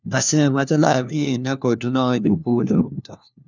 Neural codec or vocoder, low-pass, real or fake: codec, 16 kHz, 1 kbps, FunCodec, trained on LibriTTS, 50 frames a second; 7.2 kHz; fake